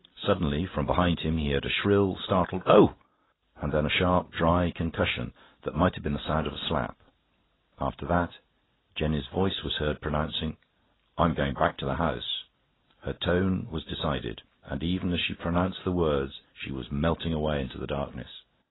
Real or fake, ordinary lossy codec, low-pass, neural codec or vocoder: real; AAC, 16 kbps; 7.2 kHz; none